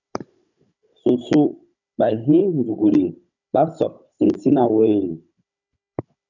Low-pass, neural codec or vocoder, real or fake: 7.2 kHz; codec, 16 kHz, 16 kbps, FunCodec, trained on Chinese and English, 50 frames a second; fake